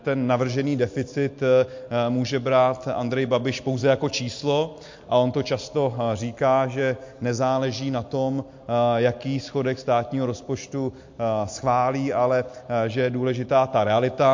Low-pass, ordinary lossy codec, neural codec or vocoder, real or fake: 7.2 kHz; MP3, 48 kbps; none; real